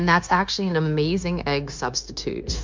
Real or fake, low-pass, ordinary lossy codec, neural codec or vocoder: fake; 7.2 kHz; MP3, 64 kbps; codec, 16 kHz, 0.9 kbps, LongCat-Audio-Codec